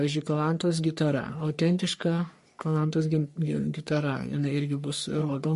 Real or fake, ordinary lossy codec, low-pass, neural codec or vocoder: fake; MP3, 48 kbps; 14.4 kHz; codec, 44.1 kHz, 3.4 kbps, Pupu-Codec